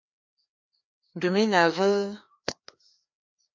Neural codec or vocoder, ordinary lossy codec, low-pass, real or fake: codec, 16 kHz, 2 kbps, FreqCodec, larger model; MP3, 48 kbps; 7.2 kHz; fake